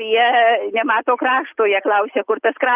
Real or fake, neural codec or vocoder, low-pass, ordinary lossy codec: real; none; 3.6 kHz; Opus, 24 kbps